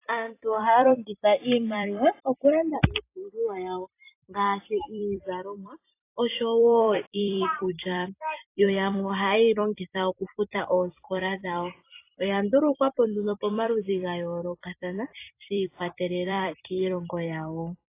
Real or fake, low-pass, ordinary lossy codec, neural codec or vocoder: fake; 3.6 kHz; AAC, 24 kbps; vocoder, 44.1 kHz, 128 mel bands every 256 samples, BigVGAN v2